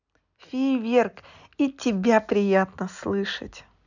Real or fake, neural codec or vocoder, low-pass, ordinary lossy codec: real; none; 7.2 kHz; none